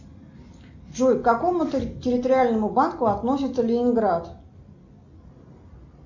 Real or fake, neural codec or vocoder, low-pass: real; none; 7.2 kHz